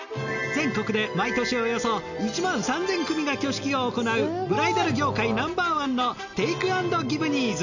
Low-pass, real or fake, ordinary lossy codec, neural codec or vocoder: 7.2 kHz; real; none; none